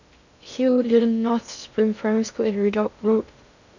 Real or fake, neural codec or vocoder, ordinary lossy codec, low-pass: fake; codec, 16 kHz in and 24 kHz out, 0.6 kbps, FocalCodec, streaming, 2048 codes; none; 7.2 kHz